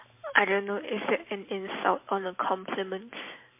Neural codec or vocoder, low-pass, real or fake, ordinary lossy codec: vocoder, 44.1 kHz, 128 mel bands every 512 samples, BigVGAN v2; 3.6 kHz; fake; MP3, 24 kbps